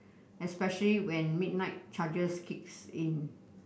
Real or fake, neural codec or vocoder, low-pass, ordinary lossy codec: real; none; none; none